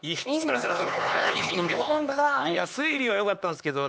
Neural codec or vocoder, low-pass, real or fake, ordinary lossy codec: codec, 16 kHz, 2 kbps, X-Codec, HuBERT features, trained on LibriSpeech; none; fake; none